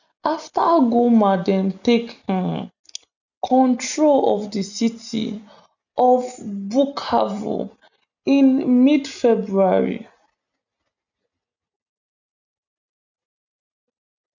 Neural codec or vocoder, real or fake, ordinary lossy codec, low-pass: none; real; none; 7.2 kHz